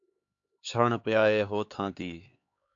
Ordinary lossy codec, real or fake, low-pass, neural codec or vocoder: AAC, 48 kbps; fake; 7.2 kHz; codec, 16 kHz, 4 kbps, X-Codec, HuBERT features, trained on LibriSpeech